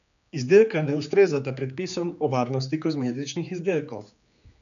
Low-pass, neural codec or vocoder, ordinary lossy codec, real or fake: 7.2 kHz; codec, 16 kHz, 4 kbps, X-Codec, HuBERT features, trained on general audio; none; fake